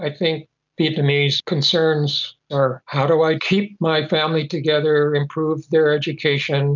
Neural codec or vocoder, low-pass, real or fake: none; 7.2 kHz; real